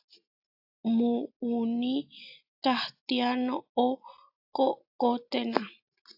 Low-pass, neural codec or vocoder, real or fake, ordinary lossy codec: 5.4 kHz; none; real; AAC, 48 kbps